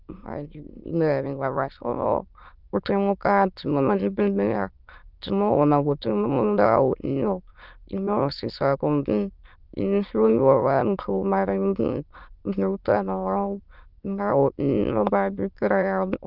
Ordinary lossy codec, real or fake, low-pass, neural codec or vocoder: Opus, 24 kbps; fake; 5.4 kHz; autoencoder, 22.05 kHz, a latent of 192 numbers a frame, VITS, trained on many speakers